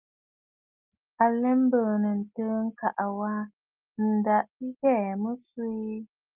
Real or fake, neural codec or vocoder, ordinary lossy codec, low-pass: real; none; Opus, 24 kbps; 3.6 kHz